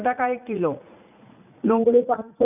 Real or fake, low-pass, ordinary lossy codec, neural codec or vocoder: fake; 3.6 kHz; none; vocoder, 44.1 kHz, 80 mel bands, Vocos